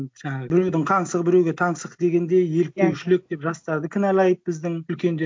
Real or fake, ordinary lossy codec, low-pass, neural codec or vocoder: real; none; none; none